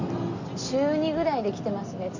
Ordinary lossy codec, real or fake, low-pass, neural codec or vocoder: none; real; 7.2 kHz; none